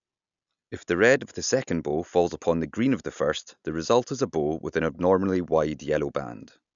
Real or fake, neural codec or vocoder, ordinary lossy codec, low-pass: real; none; none; 7.2 kHz